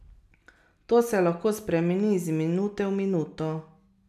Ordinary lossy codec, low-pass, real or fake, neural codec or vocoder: none; 14.4 kHz; real; none